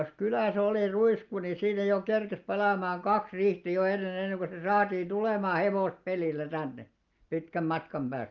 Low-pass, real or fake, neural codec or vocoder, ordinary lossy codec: 7.2 kHz; real; none; Opus, 24 kbps